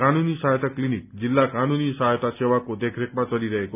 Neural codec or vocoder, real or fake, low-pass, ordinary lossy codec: none; real; 3.6 kHz; none